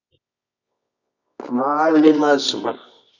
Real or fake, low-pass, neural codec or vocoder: fake; 7.2 kHz; codec, 24 kHz, 0.9 kbps, WavTokenizer, medium music audio release